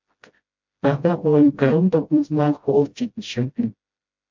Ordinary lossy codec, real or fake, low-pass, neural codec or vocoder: MP3, 48 kbps; fake; 7.2 kHz; codec, 16 kHz, 0.5 kbps, FreqCodec, smaller model